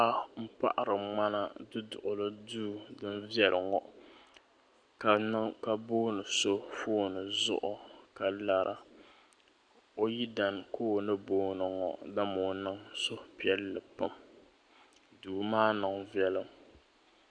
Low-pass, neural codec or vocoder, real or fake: 9.9 kHz; none; real